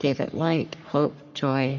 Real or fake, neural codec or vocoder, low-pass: fake; codec, 44.1 kHz, 3.4 kbps, Pupu-Codec; 7.2 kHz